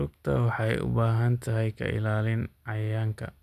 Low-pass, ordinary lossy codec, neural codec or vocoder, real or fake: 14.4 kHz; none; none; real